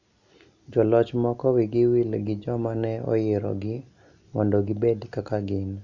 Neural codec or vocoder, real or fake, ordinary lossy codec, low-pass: none; real; none; 7.2 kHz